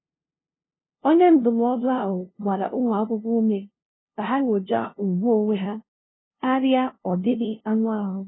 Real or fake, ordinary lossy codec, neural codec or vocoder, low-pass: fake; AAC, 16 kbps; codec, 16 kHz, 0.5 kbps, FunCodec, trained on LibriTTS, 25 frames a second; 7.2 kHz